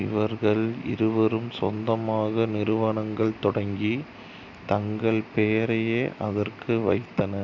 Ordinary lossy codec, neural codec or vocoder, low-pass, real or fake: none; none; 7.2 kHz; real